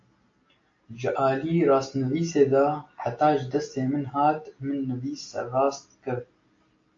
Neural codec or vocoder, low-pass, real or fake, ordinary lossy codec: none; 7.2 kHz; real; AAC, 48 kbps